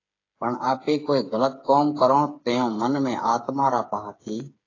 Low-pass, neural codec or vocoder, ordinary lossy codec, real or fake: 7.2 kHz; codec, 16 kHz, 8 kbps, FreqCodec, smaller model; AAC, 32 kbps; fake